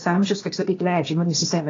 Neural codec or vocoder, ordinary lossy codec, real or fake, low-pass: codec, 16 kHz, 0.8 kbps, ZipCodec; AAC, 32 kbps; fake; 7.2 kHz